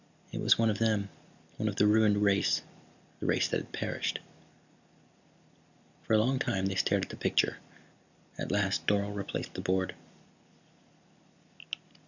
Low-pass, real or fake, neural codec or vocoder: 7.2 kHz; real; none